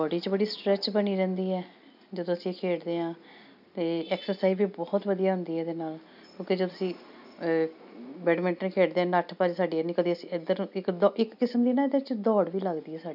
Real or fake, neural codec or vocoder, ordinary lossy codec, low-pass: real; none; none; 5.4 kHz